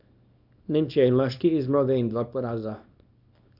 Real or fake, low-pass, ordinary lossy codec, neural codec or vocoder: fake; 5.4 kHz; none; codec, 24 kHz, 0.9 kbps, WavTokenizer, small release